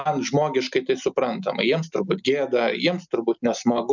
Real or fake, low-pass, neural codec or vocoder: real; 7.2 kHz; none